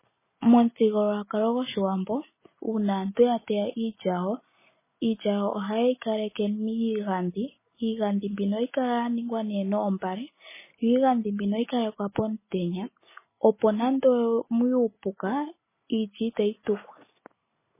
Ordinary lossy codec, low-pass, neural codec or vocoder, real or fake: MP3, 16 kbps; 3.6 kHz; none; real